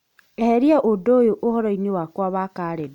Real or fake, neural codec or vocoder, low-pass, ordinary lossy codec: real; none; 19.8 kHz; none